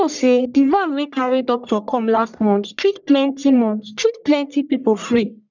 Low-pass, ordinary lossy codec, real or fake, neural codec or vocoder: 7.2 kHz; none; fake; codec, 44.1 kHz, 1.7 kbps, Pupu-Codec